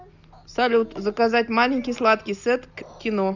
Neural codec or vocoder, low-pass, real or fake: none; 7.2 kHz; real